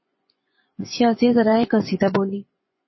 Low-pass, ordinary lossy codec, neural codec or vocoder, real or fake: 7.2 kHz; MP3, 24 kbps; vocoder, 24 kHz, 100 mel bands, Vocos; fake